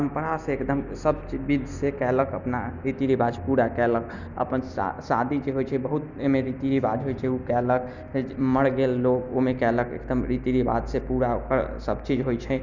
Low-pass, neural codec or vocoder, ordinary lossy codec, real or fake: 7.2 kHz; none; none; real